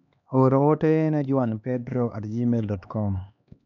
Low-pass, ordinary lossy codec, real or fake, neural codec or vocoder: 7.2 kHz; none; fake; codec, 16 kHz, 2 kbps, X-Codec, HuBERT features, trained on LibriSpeech